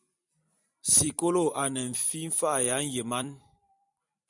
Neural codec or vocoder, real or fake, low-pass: vocoder, 44.1 kHz, 128 mel bands every 256 samples, BigVGAN v2; fake; 10.8 kHz